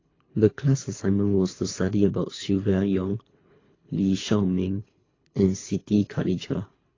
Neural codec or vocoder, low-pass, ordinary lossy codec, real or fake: codec, 24 kHz, 3 kbps, HILCodec; 7.2 kHz; AAC, 32 kbps; fake